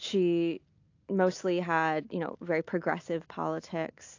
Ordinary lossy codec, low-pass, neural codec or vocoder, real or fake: AAC, 48 kbps; 7.2 kHz; none; real